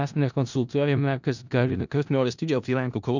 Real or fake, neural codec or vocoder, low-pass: fake; codec, 16 kHz in and 24 kHz out, 0.4 kbps, LongCat-Audio-Codec, four codebook decoder; 7.2 kHz